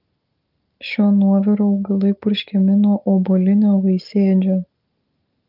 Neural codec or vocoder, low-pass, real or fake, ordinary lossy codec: none; 5.4 kHz; real; Opus, 32 kbps